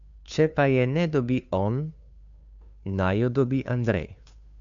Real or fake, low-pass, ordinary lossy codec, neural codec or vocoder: fake; 7.2 kHz; none; codec, 16 kHz, 2 kbps, FunCodec, trained on Chinese and English, 25 frames a second